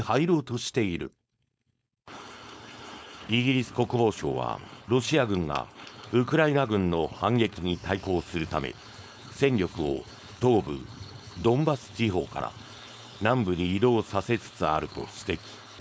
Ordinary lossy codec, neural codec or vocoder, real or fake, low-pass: none; codec, 16 kHz, 4.8 kbps, FACodec; fake; none